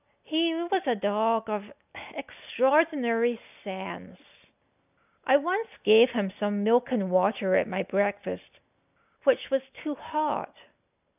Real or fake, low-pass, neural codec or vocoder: real; 3.6 kHz; none